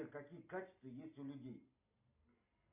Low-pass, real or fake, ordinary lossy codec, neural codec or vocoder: 3.6 kHz; real; AAC, 32 kbps; none